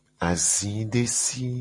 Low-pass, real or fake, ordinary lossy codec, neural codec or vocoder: 10.8 kHz; real; MP3, 48 kbps; none